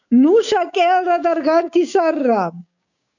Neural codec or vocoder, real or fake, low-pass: codec, 16 kHz, 6 kbps, DAC; fake; 7.2 kHz